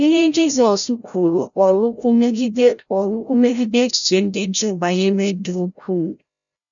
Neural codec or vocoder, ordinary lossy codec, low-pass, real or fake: codec, 16 kHz, 0.5 kbps, FreqCodec, larger model; none; 7.2 kHz; fake